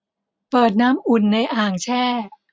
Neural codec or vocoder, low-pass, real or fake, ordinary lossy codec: none; none; real; none